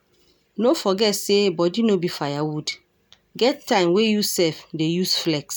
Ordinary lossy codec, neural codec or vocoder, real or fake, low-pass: none; none; real; none